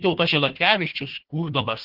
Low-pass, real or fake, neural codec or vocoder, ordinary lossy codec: 5.4 kHz; fake; codec, 16 kHz, 1 kbps, FunCodec, trained on Chinese and English, 50 frames a second; Opus, 16 kbps